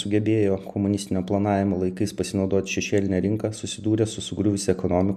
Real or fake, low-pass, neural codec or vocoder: real; 14.4 kHz; none